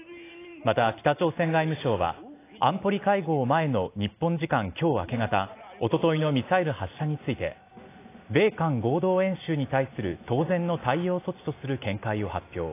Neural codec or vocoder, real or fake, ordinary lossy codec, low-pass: none; real; AAC, 24 kbps; 3.6 kHz